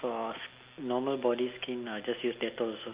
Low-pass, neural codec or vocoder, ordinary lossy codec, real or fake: 3.6 kHz; none; Opus, 32 kbps; real